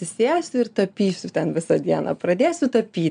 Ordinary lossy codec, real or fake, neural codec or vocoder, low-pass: MP3, 96 kbps; real; none; 9.9 kHz